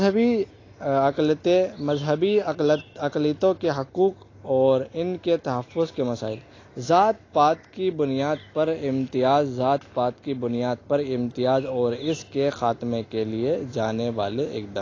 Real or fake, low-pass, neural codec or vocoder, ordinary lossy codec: real; 7.2 kHz; none; AAC, 32 kbps